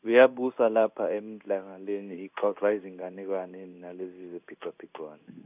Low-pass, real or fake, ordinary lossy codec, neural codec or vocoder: 3.6 kHz; fake; none; codec, 16 kHz in and 24 kHz out, 1 kbps, XY-Tokenizer